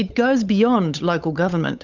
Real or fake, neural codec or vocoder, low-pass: fake; codec, 16 kHz, 8 kbps, FunCodec, trained on Chinese and English, 25 frames a second; 7.2 kHz